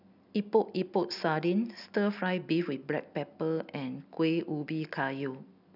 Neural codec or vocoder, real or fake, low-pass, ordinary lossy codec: none; real; 5.4 kHz; none